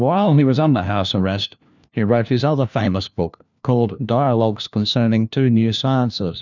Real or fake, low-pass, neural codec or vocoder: fake; 7.2 kHz; codec, 16 kHz, 1 kbps, FunCodec, trained on LibriTTS, 50 frames a second